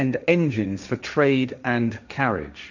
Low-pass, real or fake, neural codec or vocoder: 7.2 kHz; fake; codec, 16 kHz, 1.1 kbps, Voila-Tokenizer